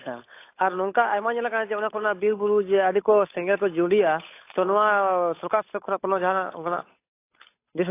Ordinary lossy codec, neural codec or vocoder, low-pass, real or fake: AAC, 24 kbps; codec, 16 kHz, 8 kbps, FunCodec, trained on Chinese and English, 25 frames a second; 3.6 kHz; fake